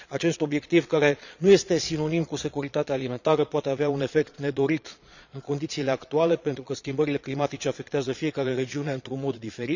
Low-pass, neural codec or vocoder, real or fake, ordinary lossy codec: 7.2 kHz; vocoder, 22.05 kHz, 80 mel bands, Vocos; fake; none